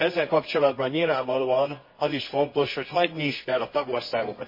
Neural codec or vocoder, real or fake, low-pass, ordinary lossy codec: codec, 24 kHz, 0.9 kbps, WavTokenizer, medium music audio release; fake; 5.4 kHz; MP3, 24 kbps